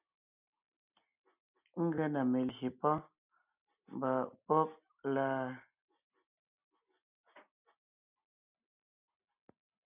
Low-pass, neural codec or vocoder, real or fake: 3.6 kHz; none; real